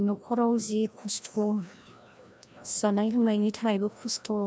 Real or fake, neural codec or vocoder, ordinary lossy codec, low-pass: fake; codec, 16 kHz, 1 kbps, FreqCodec, larger model; none; none